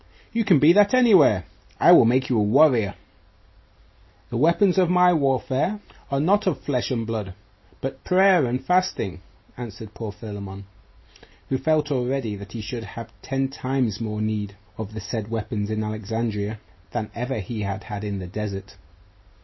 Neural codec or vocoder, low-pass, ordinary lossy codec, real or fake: none; 7.2 kHz; MP3, 24 kbps; real